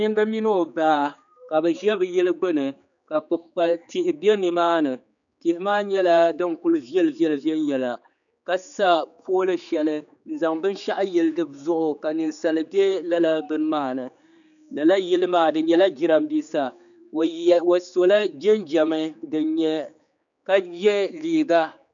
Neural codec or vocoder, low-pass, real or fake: codec, 16 kHz, 4 kbps, X-Codec, HuBERT features, trained on general audio; 7.2 kHz; fake